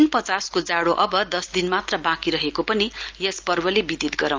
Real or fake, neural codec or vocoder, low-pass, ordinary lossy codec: real; none; 7.2 kHz; Opus, 24 kbps